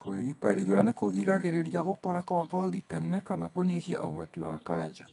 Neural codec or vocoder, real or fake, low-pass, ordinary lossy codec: codec, 24 kHz, 0.9 kbps, WavTokenizer, medium music audio release; fake; 10.8 kHz; none